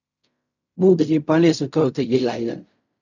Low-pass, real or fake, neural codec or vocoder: 7.2 kHz; fake; codec, 16 kHz in and 24 kHz out, 0.4 kbps, LongCat-Audio-Codec, fine tuned four codebook decoder